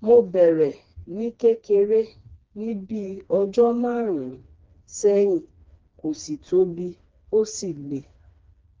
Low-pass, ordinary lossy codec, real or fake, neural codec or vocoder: 7.2 kHz; Opus, 24 kbps; fake; codec, 16 kHz, 2 kbps, FreqCodec, smaller model